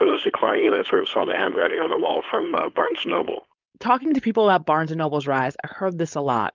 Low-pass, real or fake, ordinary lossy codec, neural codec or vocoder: 7.2 kHz; fake; Opus, 24 kbps; codec, 16 kHz, 4.8 kbps, FACodec